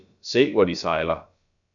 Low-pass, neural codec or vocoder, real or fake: 7.2 kHz; codec, 16 kHz, about 1 kbps, DyCAST, with the encoder's durations; fake